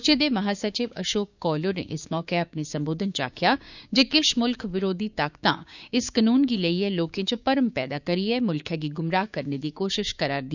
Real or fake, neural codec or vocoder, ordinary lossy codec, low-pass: fake; codec, 16 kHz, 6 kbps, DAC; none; 7.2 kHz